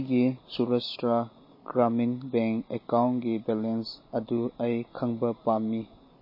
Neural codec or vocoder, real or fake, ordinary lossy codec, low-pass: none; real; MP3, 24 kbps; 5.4 kHz